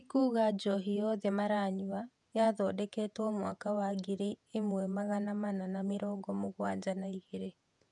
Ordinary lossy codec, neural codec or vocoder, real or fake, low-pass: none; vocoder, 48 kHz, 128 mel bands, Vocos; fake; 10.8 kHz